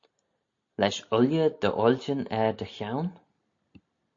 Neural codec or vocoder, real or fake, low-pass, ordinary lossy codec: none; real; 7.2 kHz; AAC, 48 kbps